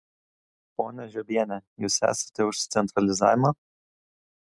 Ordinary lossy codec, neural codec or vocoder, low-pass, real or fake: MP3, 96 kbps; none; 10.8 kHz; real